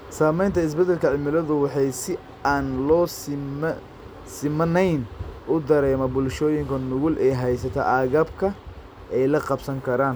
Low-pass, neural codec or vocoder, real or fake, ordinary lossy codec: none; none; real; none